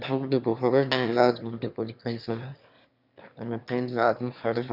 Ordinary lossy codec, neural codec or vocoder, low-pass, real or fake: none; autoencoder, 22.05 kHz, a latent of 192 numbers a frame, VITS, trained on one speaker; 5.4 kHz; fake